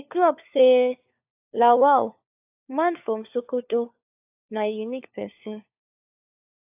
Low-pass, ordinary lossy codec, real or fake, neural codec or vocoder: 3.6 kHz; AAC, 32 kbps; fake; codec, 16 kHz, 4 kbps, FunCodec, trained on LibriTTS, 50 frames a second